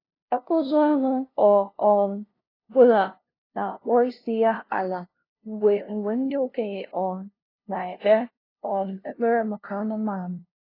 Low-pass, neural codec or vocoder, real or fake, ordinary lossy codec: 5.4 kHz; codec, 16 kHz, 0.5 kbps, FunCodec, trained on LibriTTS, 25 frames a second; fake; AAC, 24 kbps